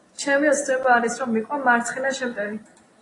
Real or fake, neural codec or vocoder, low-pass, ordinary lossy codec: real; none; 10.8 kHz; AAC, 32 kbps